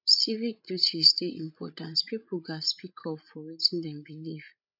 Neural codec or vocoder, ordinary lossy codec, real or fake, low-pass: codec, 16 kHz, 16 kbps, FreqCodec, larger model; none; fake; 5.4 kHz